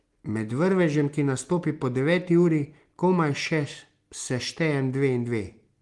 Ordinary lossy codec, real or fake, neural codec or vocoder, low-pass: Opus, 24 kbps; real; none; 10.8 kHz